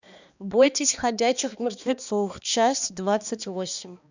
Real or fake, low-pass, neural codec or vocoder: fake; 7.2 kHz; codec, 16 kHz, 2 kbps, X-Codec, HuBERT features, trained on balanced general audio